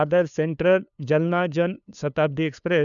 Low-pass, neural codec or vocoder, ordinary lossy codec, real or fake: 7.2 kHz; codec, 16 kHz, 2 kbps, FunCodec, trained on LibriTTS, 25 frames a second; none; fake